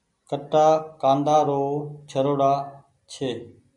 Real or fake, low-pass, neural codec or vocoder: real; 10.8 kHz; none